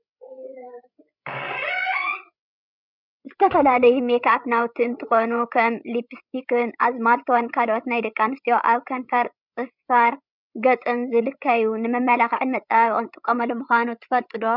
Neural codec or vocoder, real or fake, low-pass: codec, 16 kHz, 16 kbps, FreqCodec, larger model; fake; 5.4 kHz